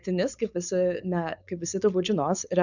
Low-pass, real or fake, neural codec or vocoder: 7.2 kHz; fake; codec, 16 kHz, 4.8 kbps, FACodec